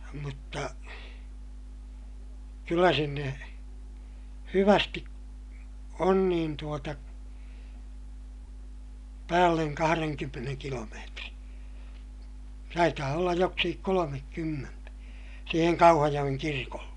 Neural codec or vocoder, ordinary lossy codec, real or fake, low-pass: none; none; real; 10.8 kHz